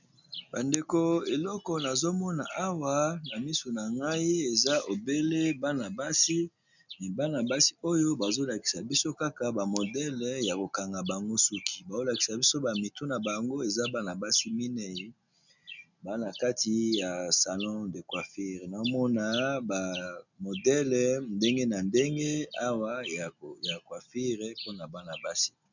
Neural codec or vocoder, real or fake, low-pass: none; real; 7.2 kHz